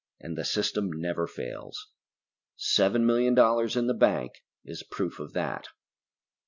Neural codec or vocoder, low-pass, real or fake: none; 7.2 kHz; real